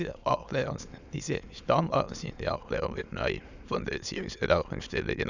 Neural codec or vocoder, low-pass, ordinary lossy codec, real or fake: autoencoder, 22.05 kHz, a latent of 192 numbers a frame, VITS, trained on many speakers; 7.2 kHz; none; fake